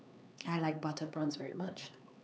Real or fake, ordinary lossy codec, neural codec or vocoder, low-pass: fake; none; codec, 16 kHz, 4 kbps, X-Codec, HuBERT features, trained on LibriSpeech; none